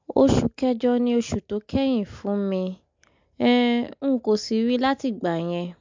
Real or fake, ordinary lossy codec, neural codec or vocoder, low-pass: real; MP3, 64 kbps; none; 7.2 kHz